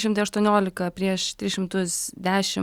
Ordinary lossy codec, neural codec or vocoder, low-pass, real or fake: Opus, 64 kbps; none; 19.8 kHz; real